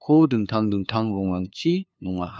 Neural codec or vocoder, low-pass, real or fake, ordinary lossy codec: codec, 16 kHz, 2 kbps, FunCodec, trained on LibriTTS, 25 frames a second; none; fake; none